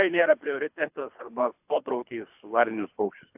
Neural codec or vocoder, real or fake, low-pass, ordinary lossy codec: codec, 24 kHz, 3 kbps, HILCodec; fake; 3.6 kHz; AAC, 32 kbps